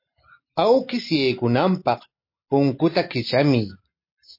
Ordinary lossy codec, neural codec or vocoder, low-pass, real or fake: MP3, 32 kbps; none; 5.4 kHz; real